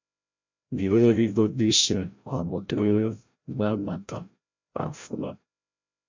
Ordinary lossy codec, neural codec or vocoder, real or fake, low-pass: MP3, 64 kbps; codec, 16 kHz, 0.5 kbps, FreqCodec, larger model; fake; 7.2 kHz